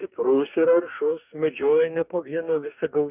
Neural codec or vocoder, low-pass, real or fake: codec, 44.1 kHz, 2.6 kbps, DAC; 3.6 kHz; fake